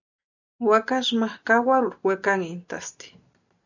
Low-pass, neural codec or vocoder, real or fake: 7.2 kHz; none; real